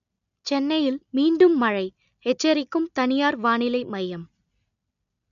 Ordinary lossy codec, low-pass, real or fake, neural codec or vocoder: none; 7.2 kHz; real; none